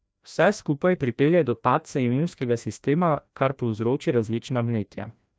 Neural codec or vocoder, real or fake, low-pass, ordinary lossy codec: codec, 16 kHz, 1 kbps, FreqCodec, larger model; fake; none; none